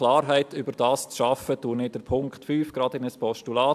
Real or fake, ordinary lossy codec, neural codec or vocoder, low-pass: real; AAC, 96 kbps; none; 14.4 kHz